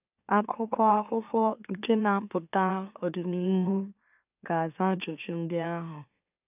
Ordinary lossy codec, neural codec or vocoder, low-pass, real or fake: none; autoencoder, 44.1 kHz, a latent of 192 numbers a frame, MeloTTS; 3.6 kHz; fake